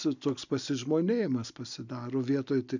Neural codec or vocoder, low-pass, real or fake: none; 7.2 kHz; real